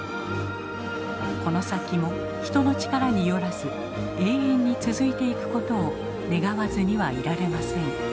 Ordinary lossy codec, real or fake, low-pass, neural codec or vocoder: none; real; none; none